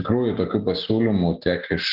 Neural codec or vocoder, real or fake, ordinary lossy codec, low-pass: none; real; Opus, 16 kbps; 5.4 kHz